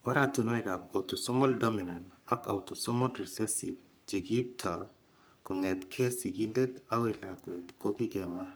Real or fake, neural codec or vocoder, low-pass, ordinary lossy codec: fake; codec, 44.1 kHz, 3.4 kbps, Pupu-Codec; none; none